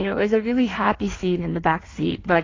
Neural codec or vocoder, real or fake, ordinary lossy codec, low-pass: codec, 16 kHz in and 24 kHz out, 1.1 kbps, FireRedTTS-2 codec; fake; AAC, 32 kbps; 7.2 kHz